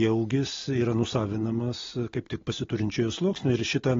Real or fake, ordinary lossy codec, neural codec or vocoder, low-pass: real; AAC, 24 kbps; none; 7.2 kHz